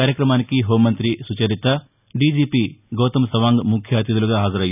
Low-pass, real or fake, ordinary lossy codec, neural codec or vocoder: 3.6 kHz; real; none; none